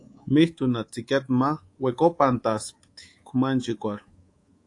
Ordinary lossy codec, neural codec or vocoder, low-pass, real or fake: AAC, 48 kbps; codec, 24 kHz, 3.1 kbps, DualCodec; 10.8 kHz; fake